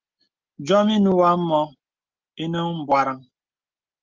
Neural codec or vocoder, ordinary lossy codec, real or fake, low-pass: none; Opus, 24 kbps; real; 7.2 kHz